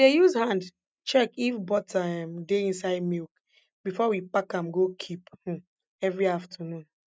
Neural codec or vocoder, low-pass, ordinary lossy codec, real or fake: none; none; none; real